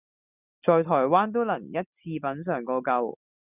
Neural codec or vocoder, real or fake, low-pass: none; real; 3.6 kHz